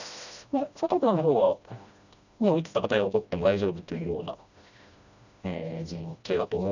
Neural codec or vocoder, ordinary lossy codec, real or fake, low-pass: codec, 16 kHz, 1 kbps, FreqCodec, smaller model; none; fake; 7.2 kHz